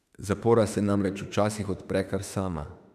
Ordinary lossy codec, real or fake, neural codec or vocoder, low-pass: none; fake; autoencoder, 48 kHz, 32 numbers a frame, DAC-VAE, trained on Japanese speech; 14.4 kHz